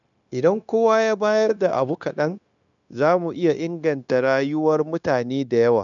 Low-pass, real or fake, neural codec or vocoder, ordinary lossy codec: 7.2 kHz; fake; codec, 16 kHz, 0.9 kbps, LongCat-Audio-Codec; none